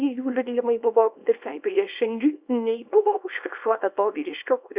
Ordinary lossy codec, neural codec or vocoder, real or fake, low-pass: Opus, 64 kbps; codec, 24 kHz, 0.9 kbps, WavTokenizer, small release; fake; 3.6 kHz